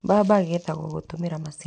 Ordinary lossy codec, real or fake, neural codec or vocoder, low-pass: none; real; none; 9.9 kHz